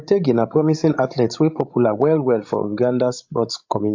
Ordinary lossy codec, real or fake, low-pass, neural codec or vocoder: AAC, 48 kbps; fake; 7.2 kHz; codec, 16 kHz, 16 kbps, FreqCodec, larger model